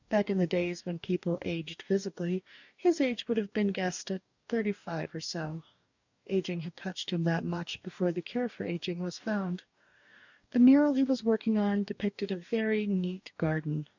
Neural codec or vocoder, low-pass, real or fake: codec, 44.1 kHz, 2.6 kbps, DAC; 7.2 kHz; fake